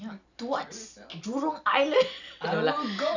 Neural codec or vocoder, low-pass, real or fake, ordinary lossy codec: none; 7.2 kHz; real; none